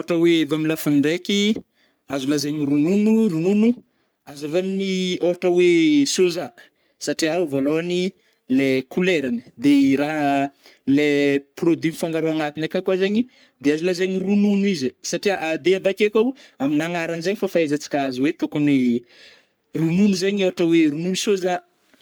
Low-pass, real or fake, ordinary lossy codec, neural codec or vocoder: none; fake; none; codec, 44.1 kHz, 3.4 kbps, Pupu-Codec